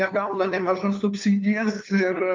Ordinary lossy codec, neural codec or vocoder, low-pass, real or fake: Opus, 24 kbps; codec, 16 kHz, 2 kbps, FunCodec, trained on LibriTTS, 25 frames a second; 7.2 kHz; fake